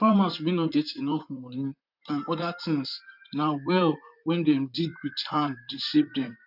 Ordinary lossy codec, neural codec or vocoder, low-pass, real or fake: none; vocoder, 44.1 kHz, 128 mel bands, Pupu-Vocoder; 5.4 kHz; fake